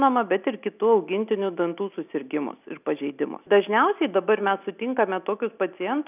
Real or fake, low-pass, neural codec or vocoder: real; 3.6 kHz; none